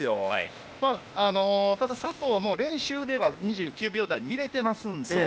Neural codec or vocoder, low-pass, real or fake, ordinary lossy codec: codec, 16 kHz, 0.8 kbps, ZipCodec; none; fake; none